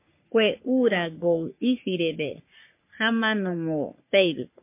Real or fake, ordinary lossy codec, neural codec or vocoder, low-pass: fake; MP3, 24 kbps; codec, 44.1 kHz, 3.4 kbps, Pupu-Codec; 3.6 kHz